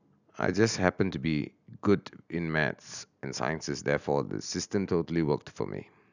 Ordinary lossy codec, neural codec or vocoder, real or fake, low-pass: none; none; real; 7.2 kHz